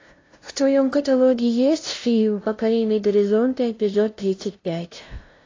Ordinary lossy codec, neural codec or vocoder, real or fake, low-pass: AAC, 32 kbps; codec, 16 kHz, 0.5 kbps, FunCodec, trained on LibriTTS, 25 frames a second; fake; 7.2 kHz